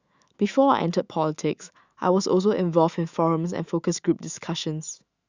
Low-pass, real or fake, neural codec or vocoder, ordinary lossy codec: 7.2 kHz; fake; autoencoder, 48 kHz, 128 numbers a frame, DAC-VAE, trained on Japanese speech; Opus, 64 kbps